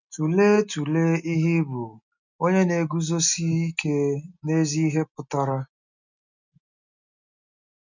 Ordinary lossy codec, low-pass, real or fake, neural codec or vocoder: none; 7.2 kHz; real; none